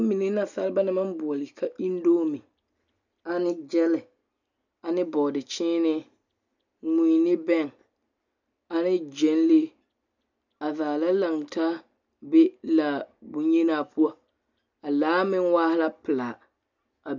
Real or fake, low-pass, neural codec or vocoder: real; 7.2 kHz; none